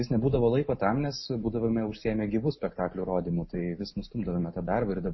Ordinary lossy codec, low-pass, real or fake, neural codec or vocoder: MP3, 24 kbps; 7.2 kHz; real; none